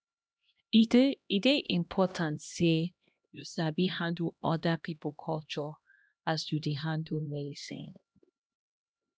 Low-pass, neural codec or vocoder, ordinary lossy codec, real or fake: none; codec, 16 kHz, 1 kbps, X-Codec, HuBERT features, trained on LibriSpeech; none; fake